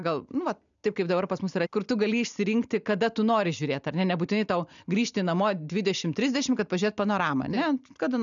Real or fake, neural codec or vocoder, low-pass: real; none; 7.2 kHz